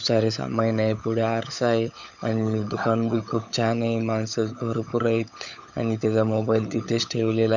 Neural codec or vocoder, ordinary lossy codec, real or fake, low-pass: codec, 16 kHz, 16 kbps, FunCodec, trained on LibriTTS, 50 frames a second; none; fake; 7.2 kHz